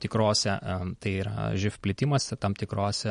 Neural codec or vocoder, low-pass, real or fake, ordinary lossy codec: none; 19.8 kHz; real; MP3, 48 kbps